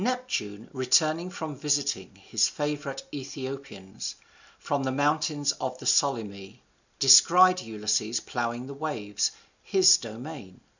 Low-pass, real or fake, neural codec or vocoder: 7.2 kHz; real; none